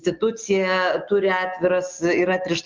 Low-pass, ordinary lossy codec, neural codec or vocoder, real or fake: 7.2 kHz; Opus, 32 kbps; none; real